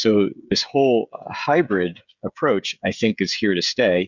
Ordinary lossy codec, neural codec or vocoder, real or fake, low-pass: Opus, 64 kbps; codec, 16 kHz, 16 kbps, FreqCodec, smaller model; fake; 7.2 kHz